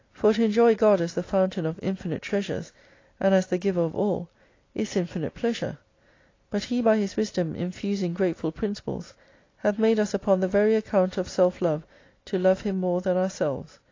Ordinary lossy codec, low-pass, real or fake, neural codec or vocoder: AAC, 32 kbps; 7.2 kHz; real; none